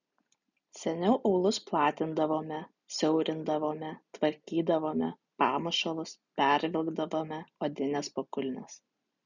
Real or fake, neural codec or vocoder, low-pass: real; none; 7.2 kHz